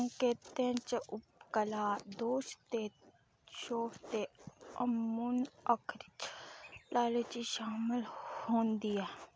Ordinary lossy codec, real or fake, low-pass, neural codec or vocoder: none; real; none; none